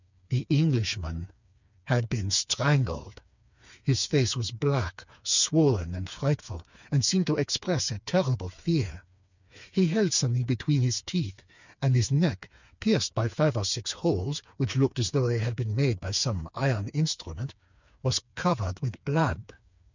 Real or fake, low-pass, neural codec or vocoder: fake; 7.2 kHz; codec, 16 kHz, 4 kbps, FreqCodec, smaller model